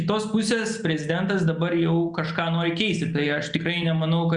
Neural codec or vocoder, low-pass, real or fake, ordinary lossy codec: none; 9.9 kHz; real; Opus, 64 kbps